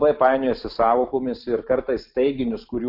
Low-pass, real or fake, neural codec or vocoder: 5.4 kHz; real; none